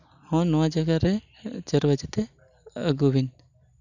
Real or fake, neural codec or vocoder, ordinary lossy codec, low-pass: real; none; none; 7.2 kHz